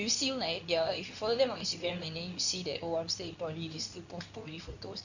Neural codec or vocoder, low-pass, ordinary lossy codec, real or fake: codec, 24 kHz, 0.9 kbps, WavTokenizer, medium speech release version 2; 7.2 kHz; none; fake